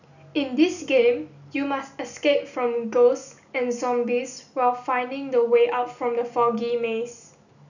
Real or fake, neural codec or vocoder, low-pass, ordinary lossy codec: real; none; 7.2 kHz; none